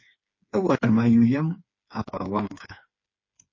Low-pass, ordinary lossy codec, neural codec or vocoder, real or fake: 7.2 kHz; MP3, 32 kbps; codec, 16 kHz, 4 kbps, FreqCodec, smaller model; fake